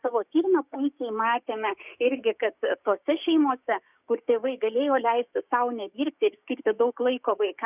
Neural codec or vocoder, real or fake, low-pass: codec, 16 kHz, 6 kbps, DAC; fake; 3.6 kHz